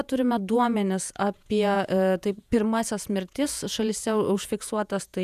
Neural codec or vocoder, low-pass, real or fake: vocoder, 48 kHz, 128 mel bands, Vocos; 14.4 kHz; fake